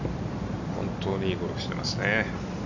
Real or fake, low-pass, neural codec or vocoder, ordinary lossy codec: real; 7.2 kHz; none; none